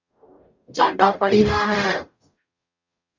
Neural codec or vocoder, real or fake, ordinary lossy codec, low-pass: codec, 44.1 kHz, 0.9 kbps, DAC; fake; Opus, 64 kbps; 7.2 kHz